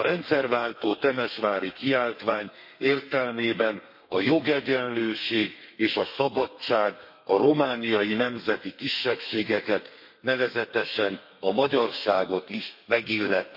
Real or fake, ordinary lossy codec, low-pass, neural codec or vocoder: fake; MP3, 32 kbps; 5.4 kHz; codec, 32 kHz, 1.9 kbps, SNAC